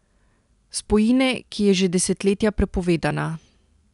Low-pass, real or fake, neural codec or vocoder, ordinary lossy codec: 10.8 kHz; real; none; none